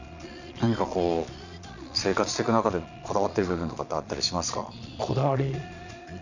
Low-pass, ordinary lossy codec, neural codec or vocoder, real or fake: 7.2 kHz; none; vocoder, 22.05 kHz, 80 mel bands, Vocos; fake